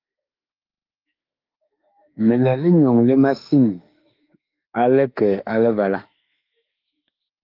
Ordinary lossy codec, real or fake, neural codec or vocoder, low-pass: Opus, 32 kbps; fake; autoencoder, 48 kHz, 32 numbers a frame, DAC-VAE, trained on Japanese speech; 5.4 kHz